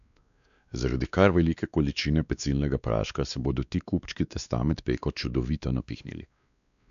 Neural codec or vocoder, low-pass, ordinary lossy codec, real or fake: codec, 16 kHz, 2 kbps, X-Codec, WavLM features, trained on Multilingual LibriSpeech; 7.2 kHz; none; fake